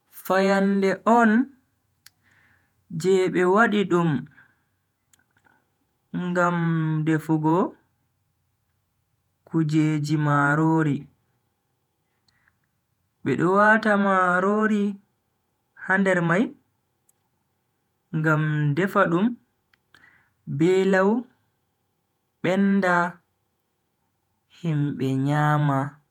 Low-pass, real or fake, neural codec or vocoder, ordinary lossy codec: 19.8 kHz; fake; vocoder, 48 kHz, 128 mel bands, Vocos; none